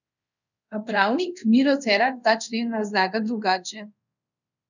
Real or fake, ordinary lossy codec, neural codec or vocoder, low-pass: fake; none; codec, 24 kHz, 0.5 kbps, DualCodec; 7.2 kHz